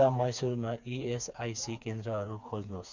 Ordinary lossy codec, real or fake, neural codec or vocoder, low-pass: Opus, 64 kbps; fake; codec, 16 kHz, 4 kbps, FreqCodec, smaller model; 7.2 kHz